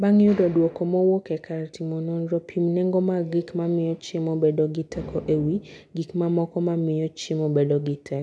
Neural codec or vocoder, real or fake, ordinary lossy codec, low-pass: none; real; none; none